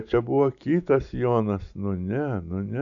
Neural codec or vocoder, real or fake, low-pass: none; real; 7.2 kHz